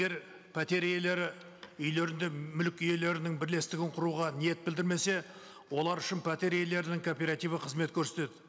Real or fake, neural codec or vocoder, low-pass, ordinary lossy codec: real; none; none; none